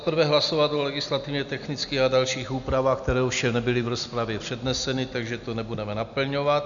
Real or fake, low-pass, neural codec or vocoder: real; 7.2 kHz; none